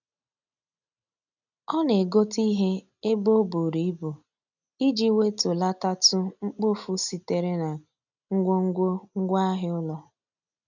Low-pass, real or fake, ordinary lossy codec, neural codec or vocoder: 7.2 kHz; real; none; none